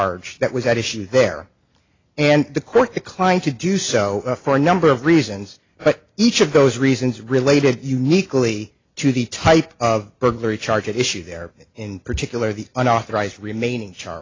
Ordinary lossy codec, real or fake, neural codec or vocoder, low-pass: AAC, 32 kbps; real; none; 7.2 kHz